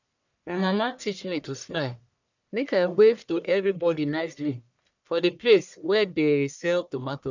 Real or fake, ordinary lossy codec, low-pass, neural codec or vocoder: fake; none; 7.2 kHz; codec, 44.1 kHz, 1.7 kbps, Pupu-Codec